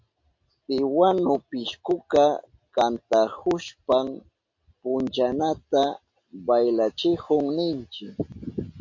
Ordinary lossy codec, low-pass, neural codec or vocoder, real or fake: MP3, 48 kbps; 7.2 kHz; none; real